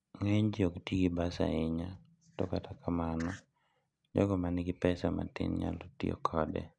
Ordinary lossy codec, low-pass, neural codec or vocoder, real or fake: none; 9.9 kHz; none; real